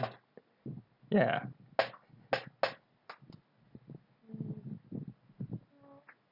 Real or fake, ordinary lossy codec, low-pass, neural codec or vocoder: real; none; 5.4 kHz; none